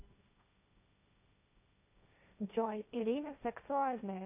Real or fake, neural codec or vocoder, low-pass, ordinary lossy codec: fake; codec, 16 kHz, 1.1 kbps, Voila-Tokenizer; 3.6 kHz; none